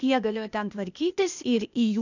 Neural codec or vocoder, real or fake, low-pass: codec, 16 kHz, 0.8 kbps, ZipCodec; fake; 7.2 kHz